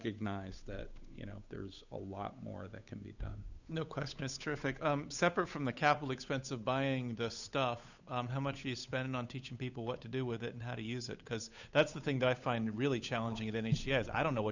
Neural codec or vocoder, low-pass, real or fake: codec, 16 kHz, 8 kbps, FunCodec, trained on Chinese and English, 25 frames a second; 7.2 kHz; fake